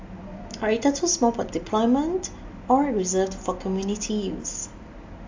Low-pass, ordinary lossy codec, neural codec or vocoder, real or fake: 7.2 kHz; AAC, 48 kbps; none; real